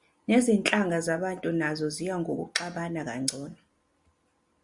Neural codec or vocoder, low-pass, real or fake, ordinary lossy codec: none; 10.8 kHz; real; Opus, 64 kbps